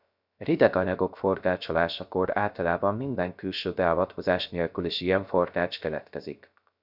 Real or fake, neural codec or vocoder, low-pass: fake; codec, 16 kHz, 0.3 kbps, FocalCodec; 5.4 kHz